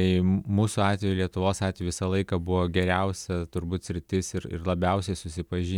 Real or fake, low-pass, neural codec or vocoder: real; 19.8 kHz; none